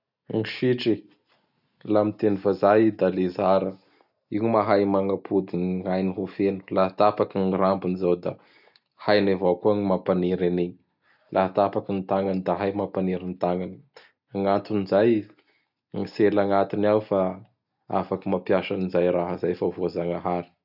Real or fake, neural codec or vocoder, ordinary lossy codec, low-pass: real; none; none; 5.4 kHz